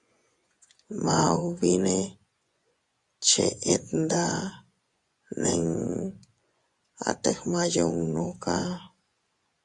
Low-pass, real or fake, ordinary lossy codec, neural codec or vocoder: 10.8 kHz; fake; Opus, 64 kbps; vocoder, 24 kHz, 100 mel bands, Vocos